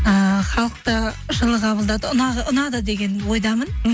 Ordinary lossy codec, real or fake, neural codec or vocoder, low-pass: none; real; none; none